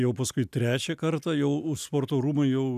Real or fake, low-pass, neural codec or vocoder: real; 14.4 kHz; none